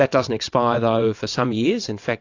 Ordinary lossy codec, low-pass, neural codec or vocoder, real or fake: AAC, 48 kbps; 7.2 kHz; vocoder, 22.05 kHz, 80 mel bands, WaveNeXt; fake